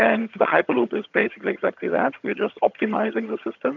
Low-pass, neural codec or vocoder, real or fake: 7.2 kHz; vocoder, 22.05 kHz, 80 mel bands, HiFi-GAN; fake